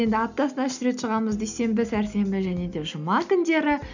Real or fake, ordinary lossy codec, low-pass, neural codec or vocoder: real; none; 7.2 kHz; none